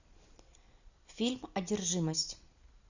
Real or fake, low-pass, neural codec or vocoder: real; 7.2 kHz; none